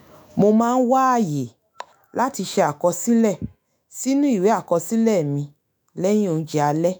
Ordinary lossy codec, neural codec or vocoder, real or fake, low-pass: none; autoencoder, 48 kHz, 128 numbers a frame, DAC-VAE, trained on Japanese speech; fake; none